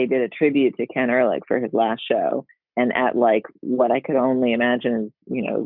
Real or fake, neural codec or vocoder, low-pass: real; none; 5.4 kHz